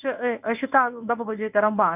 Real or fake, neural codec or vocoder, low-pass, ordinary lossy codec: fake; codec, 24 kHz, 0.9 kbps, WavTokenizer, medium speech release version 1; 3.6 kHz; none